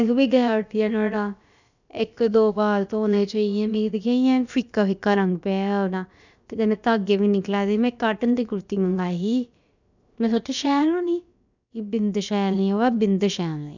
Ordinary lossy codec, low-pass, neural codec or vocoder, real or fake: none; 7.2 kHz; codec, 16 kHz, about 1 kbps, DyCAST, with the encoder's durations; fake